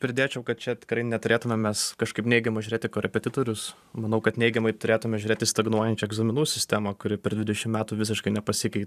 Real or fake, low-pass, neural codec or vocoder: fake; 14.4 kHz; vocoder, 44.1 kHz, 128 mel bands every 512 samples, BigVGAN v2